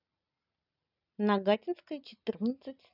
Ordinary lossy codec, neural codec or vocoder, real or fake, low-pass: none; none; real; 5.4 kHz